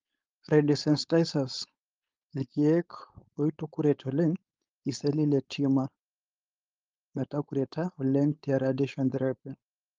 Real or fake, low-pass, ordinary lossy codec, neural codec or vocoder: fake; 7.2 kHz; Opus, 32 kbps; codec, 16 kHz, 4.8 kbps, FACodec